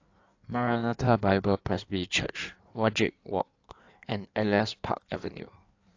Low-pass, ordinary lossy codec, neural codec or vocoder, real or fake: 7.2 kHz; AAC, 48 kbps; codec, 16 kHz in and 24 kHz out, 1.1 kbps, FireRedTTS-2 codec; fake